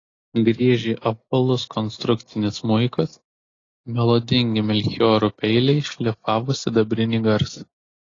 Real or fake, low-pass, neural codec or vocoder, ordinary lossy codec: real; 7.2 kHz; none; AAC, 32 kbps